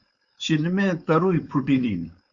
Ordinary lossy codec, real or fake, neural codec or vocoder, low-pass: Opus, 64 kbps; fake; codec, 16 kHz, 4.8 kbps, FACodec; 7.2 kHz